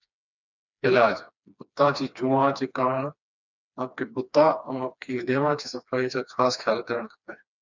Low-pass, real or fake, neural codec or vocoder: 7.2 kHz; fake; codec, 16 kHz, 2 kbps, FreqCodec, smaller model